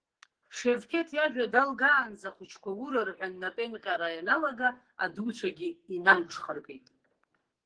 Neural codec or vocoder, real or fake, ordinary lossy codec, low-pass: codec, 44.1 kHz, 2.6 kbps, SNAC; fake; Opus, 16 kbps; 9.9 kHz